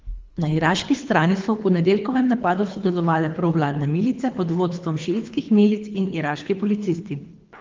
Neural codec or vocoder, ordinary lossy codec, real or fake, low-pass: codec, 24 kHz, 3 kbps, HILCodec; Opus, 24 kbps; fake; 7.2 kHz